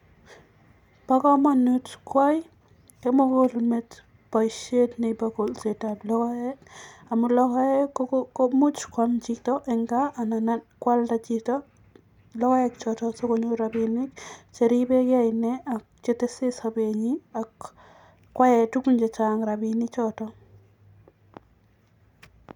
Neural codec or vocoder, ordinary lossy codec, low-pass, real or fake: none; none; 19.8 kHz; real